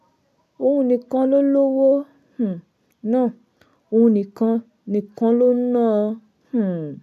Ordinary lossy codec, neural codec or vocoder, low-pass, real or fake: none; none; 14.4 kHz; real